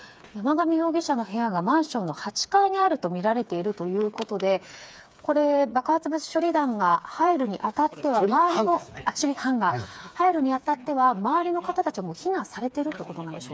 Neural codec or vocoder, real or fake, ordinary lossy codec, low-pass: codec, 16 kHz, 4 kbps, FreqCodec, smaller model; fake; none; none